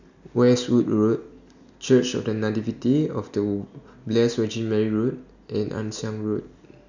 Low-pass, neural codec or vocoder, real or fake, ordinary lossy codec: 7.2 kHz; none; real; none